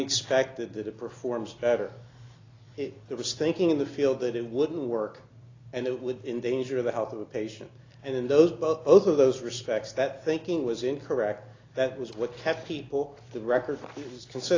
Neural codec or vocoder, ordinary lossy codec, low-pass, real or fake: none; AAC, 32 kbps; 7.2 kHz; real